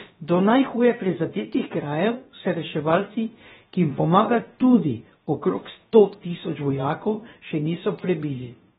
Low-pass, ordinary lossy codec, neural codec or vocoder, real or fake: 7.2 kHz; AAC, 16 kbps; codec, 16 kHz, about 1 kbps, DyCAST, with the encoder's durations; fake